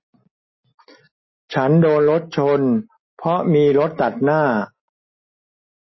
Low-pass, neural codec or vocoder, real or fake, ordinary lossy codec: 7.2 kHz; none; real; MP3, 24 kbps